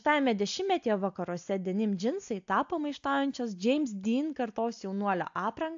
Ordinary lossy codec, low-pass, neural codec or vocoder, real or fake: AAC, 64 kbps; 7.2 kHz; none; real